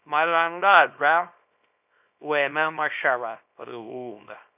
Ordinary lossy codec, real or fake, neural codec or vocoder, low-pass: none; fake; codec, 24 kHz, 0.9 kbps, WavTokenizer, small release; 3.6 kHz